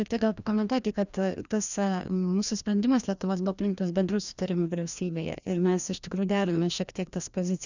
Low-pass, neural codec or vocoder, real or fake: 7.2 kHz; codec, 16 kHz, 1 kbps, FreqCodec, larger model; fake